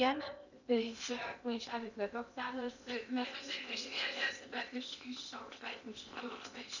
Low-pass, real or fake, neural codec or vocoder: 7.2 kHz; fake; codec, 16 kHz in and 24 kHz out, 0.8 kbps, FocalCodec, streaming, 65536 codes